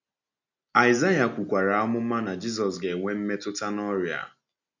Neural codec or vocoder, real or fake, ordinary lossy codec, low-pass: none; real; none; 7.2 kHz